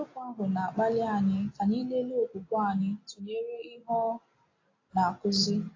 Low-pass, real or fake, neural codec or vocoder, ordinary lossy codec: 7.2 kHz; real; none; AAC, 32 kbps